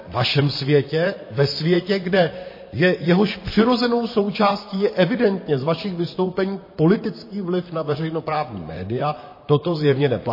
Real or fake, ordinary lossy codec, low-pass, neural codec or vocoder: fake; MP3, 24 kbps; 5.4 kHz; vocoder, 44.1 kHz, 128 mel bands, Pupu-Vocoder